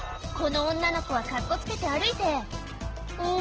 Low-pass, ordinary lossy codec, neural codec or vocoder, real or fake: 7.2 kHz; Opus, 16 kbps; none; real